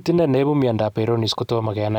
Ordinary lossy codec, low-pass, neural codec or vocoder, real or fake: none; 19.8 kHz; none; real